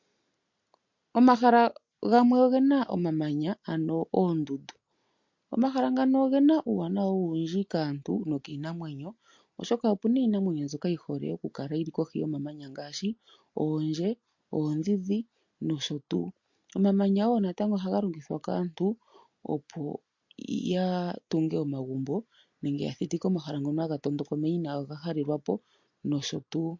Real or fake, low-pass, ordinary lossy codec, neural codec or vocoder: real; 7.2 kHz; MP3, 48 kbps; none